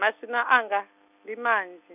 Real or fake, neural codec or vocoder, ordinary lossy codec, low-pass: real; none; none; 3.6 kHz